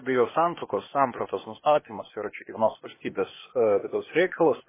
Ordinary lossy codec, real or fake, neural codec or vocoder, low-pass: MP3, 16 kbps; fake; codec, 16 kHz, 0.8 kbps, ZipCodec; 3.6 kHz